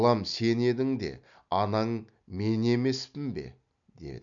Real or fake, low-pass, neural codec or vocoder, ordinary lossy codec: real; 7.2 kHz; none; none